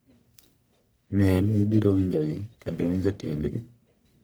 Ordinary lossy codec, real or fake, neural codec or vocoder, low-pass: none; fake; codec, 44.1 kHz, 1.7 kbps, Pupu-Codec; none